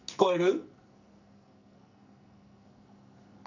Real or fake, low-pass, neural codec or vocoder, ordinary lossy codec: fake; 7.2 kHz; codec, 44.1 kHz, 2.6 kbps, SNAC; none